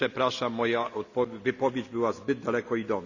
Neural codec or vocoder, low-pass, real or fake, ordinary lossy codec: none; 7.2 kHz; real; none